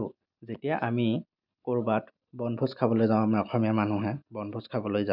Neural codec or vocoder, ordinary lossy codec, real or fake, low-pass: none; none; real; 5.4 kHz